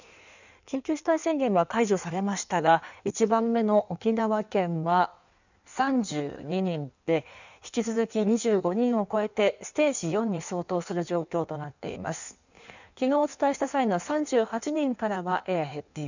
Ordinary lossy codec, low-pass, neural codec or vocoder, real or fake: none; 7.2 kHz; codec, 16 kHz in and 24 kHz out, 1.1 kbps, FireRedTTS-2 codec; fake